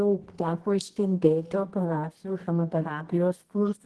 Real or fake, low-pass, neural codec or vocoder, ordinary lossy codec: fake; 10.8 kHz; codec, 24 kHz, 0.9 kbps, WavTokenizer, medium music audio release; Opus, 16 kbps